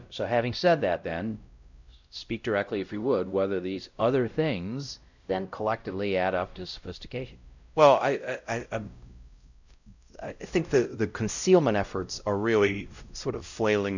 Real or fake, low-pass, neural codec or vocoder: fake; 7.2 kHz; codec, 16 kHz, 0.5 kbps, X-Codec, WavLM features, trained on Multilingual LibriSpeech